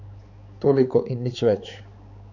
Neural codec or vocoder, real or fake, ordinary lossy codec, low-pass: codec, 16 kHz, 4 kbps, X-Codec, HuBERT features, trained on balanced general audio; fake; none; 7.2 kHz